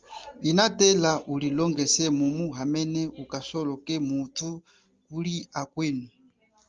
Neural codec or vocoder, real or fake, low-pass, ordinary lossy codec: none; real; 7.2 kHz; Opus, 24 kbps